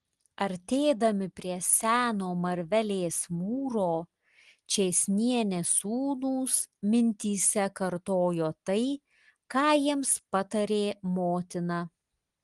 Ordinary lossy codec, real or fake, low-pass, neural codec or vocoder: Opus, 24 kbps; real; 10.8 kHz; none